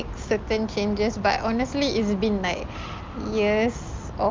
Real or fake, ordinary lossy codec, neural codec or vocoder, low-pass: real; Opus, 24 kbps; none; 7.2 kHz